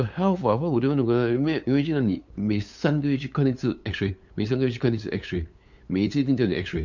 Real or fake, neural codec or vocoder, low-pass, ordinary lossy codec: fake; codec, 16 kHz, 8 kbps, FunCodec, trained on LibriTTS, 25 frames a second; 7.2 kHz; MP3, 64 kbps